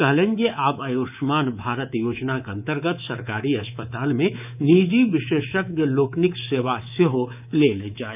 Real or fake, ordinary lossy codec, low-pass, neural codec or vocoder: fake; none; 3.6 kHz; codec, 24 kHz, 3.1 kbps, DualCodec